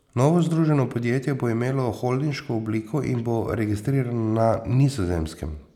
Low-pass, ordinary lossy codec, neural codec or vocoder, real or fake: 19.8 kHz; none; none; real